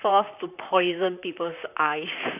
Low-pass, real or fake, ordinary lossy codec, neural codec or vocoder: 3.6 kHz; fake; none; vocoder, 44.1 kHz, 128 mel bands, Pupu-Vocoder